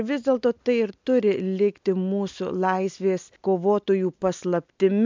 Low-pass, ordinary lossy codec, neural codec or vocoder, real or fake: 7.2 kHz; MP3, 64 kbps; none; real